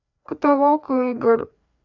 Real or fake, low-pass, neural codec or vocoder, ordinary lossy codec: fake; 7.2 kHz; codec, 16 kHz, 2 kbps, FreqCodec, larger model; none